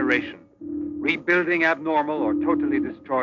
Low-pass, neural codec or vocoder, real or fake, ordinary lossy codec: 7.2 kHz; none; real; MP3, 64 kbps